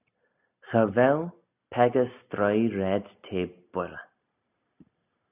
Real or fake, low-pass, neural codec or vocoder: real; 3.6 kHz; none